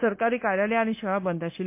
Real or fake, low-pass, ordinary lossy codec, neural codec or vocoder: fake; 3.6 kHz; MP3, 24 kbps; codec, 16 kHz, 0.9 kbps, LongCat-Audio-Codec